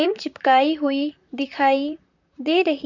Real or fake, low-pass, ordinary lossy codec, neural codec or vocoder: fake; 7.2 kHz; none; vocoder, 44.1 kHz, 128 mel bands, Pupu-Vocoder